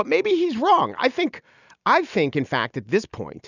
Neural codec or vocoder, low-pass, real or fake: none; 7.2 kHz; real